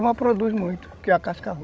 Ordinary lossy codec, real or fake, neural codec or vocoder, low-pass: none; fake; codec, 16 kHz, 16 kbps, FreqCodec, larger model; none